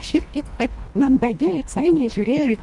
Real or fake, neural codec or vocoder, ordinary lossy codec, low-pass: fake; codec, 24 kHz, 1.5 kbps, HILCodec; Opus, 64 kbps; 10.8 kHz